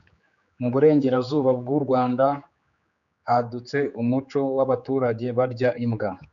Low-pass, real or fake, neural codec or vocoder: 7.2 kHz; fake; codec, 16 kHz, 4 kbps, X-Codec, HuBERT features, trained on general audio